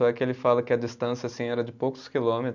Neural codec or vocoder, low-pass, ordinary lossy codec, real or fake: none; 7.2 kHz; none; real